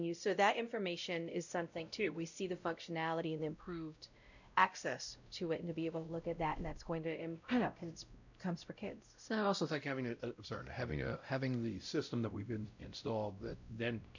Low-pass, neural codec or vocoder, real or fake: 7.2 kHz; codec, 16 kHz, 0.5 kbps, X-Codec, WavLM features, trained on Multilingual LibriSpeech; fake